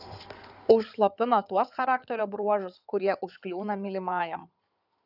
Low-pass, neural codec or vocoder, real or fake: 5.4 kHz; codec, 16 kHz in and 24 kHz out, 2.2 kbps, FireRedTTS-2 codec; fake